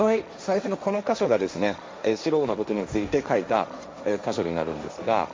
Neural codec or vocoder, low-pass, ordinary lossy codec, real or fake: codec, 16 kHz, 1.1 kbps, Voila-Tokenizer; none; none; fake